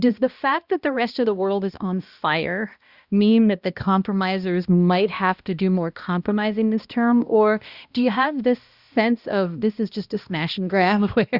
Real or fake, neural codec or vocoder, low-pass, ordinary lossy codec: fake; codec, 16 kHz, 1 kbps, X-Codec, HuBERT features, trained on balanced general audio; 5.4 kHz; Opus, 64 kbps